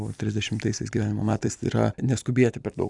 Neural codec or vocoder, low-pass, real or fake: vocoder, 44.1 kHz, 128 mel bands every 256 samples, BigVGAN v2; 10.8 kHz; fake